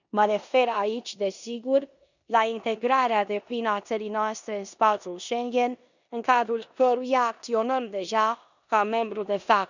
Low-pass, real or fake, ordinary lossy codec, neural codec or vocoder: 7.2 kHz; fake; none; codec, 16 kHz in and 24 kHz out, 0.9 kbps, LongCat-Audio-Codec, four codebook decoder